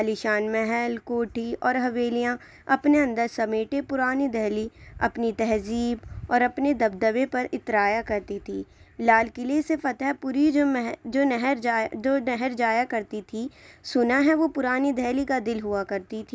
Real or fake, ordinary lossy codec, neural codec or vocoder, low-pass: real; none; none; none